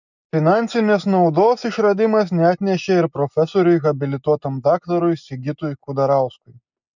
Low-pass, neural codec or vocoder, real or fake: 7.2 kHz; none; real